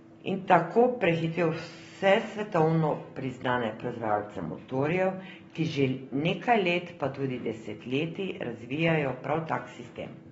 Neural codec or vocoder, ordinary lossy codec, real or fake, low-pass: none; AAC, 24 kbps; real; 19.8 kHz